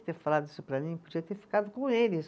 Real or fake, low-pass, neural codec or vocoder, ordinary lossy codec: real; none; none; none